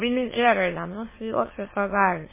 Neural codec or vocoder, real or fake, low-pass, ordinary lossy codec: autoencoder, 22.05 kHz, a latent of 192 numbers a frame, VITS, trained on many speakers; fake; 3.6 kHz; MP3, 16 kbps